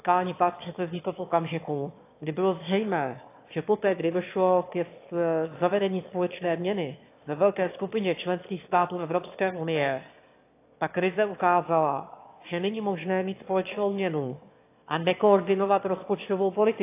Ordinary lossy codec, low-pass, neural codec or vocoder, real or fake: AAC, 24 kbps; 3.6 kHz; autoencoder, 22.05 kHz, a latent of 192 numbers a frame, VITS, trained on one speaker; fake